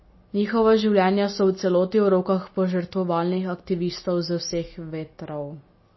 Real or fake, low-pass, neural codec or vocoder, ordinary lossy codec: real; 7.2 kHz; none; MP3, 24 kbps